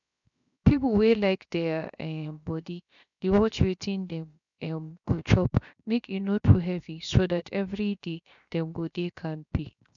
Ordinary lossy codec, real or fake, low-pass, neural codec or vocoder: none; fake; 7.2 kHz; codec, 16 kHz, 0.7 kbps, FocalCodec